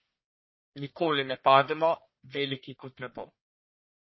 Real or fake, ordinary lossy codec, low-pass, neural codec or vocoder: fake; MP3, 24 kbps; 7.2 kHz; codec, 44.1 kHz, 1.7 kbps, Pupu-Codec